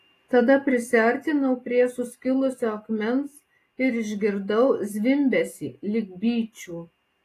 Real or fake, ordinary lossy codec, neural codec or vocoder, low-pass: real; AAC, 48 kbps; none; 14.4 kHz